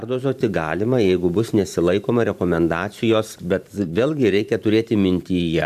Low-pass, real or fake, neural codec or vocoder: 14.4 kHz; fake; vocoder, 44.1 kHz, 128 mel bands every 512 samples, BigVGAN v2